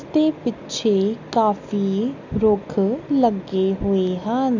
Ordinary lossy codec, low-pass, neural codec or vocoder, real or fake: none; 7.2 kHz; none; real